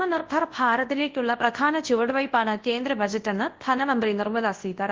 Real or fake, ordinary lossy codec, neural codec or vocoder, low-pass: fake; Opus, 16 kbps; codec, 24 kHz, 0.9 kbps, WavTokenizer, large speech release; 7.2 kHz